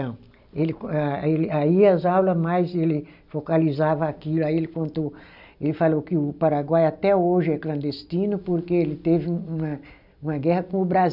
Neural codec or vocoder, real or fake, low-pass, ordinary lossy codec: none; real; 5.4 kHz; none